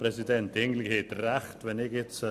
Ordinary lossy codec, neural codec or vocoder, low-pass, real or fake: AAC, 96 kbps; none; 14.4 kHz; real